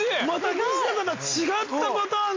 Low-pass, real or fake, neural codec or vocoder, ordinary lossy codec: 7.2 kHz; real; none; AAC, 32 kbps